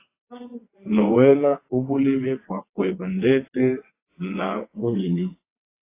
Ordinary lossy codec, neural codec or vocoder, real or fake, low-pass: AAC, 24 kbps; vocoder, 22.05 kHz, 80 mel bands, WaveNeXt; fake; 3.6 kHz